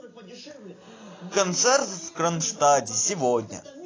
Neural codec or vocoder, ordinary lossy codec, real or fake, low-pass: none; AAC, 32 kbps; real; 7.2 kHz